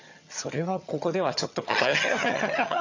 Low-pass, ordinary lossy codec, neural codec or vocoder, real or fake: 7.2 kHz; AAC, 48 kbps; vocoder, 22.05 kHz, 80 mel bands, HiFi-GAN; fake